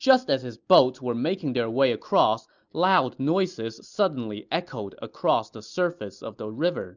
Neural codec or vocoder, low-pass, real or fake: none; 7.2 kHz; real